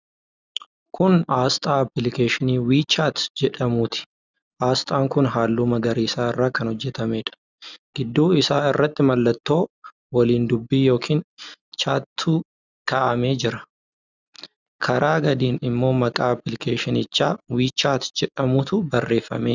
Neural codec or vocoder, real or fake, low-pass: none; real; 7.2 kHz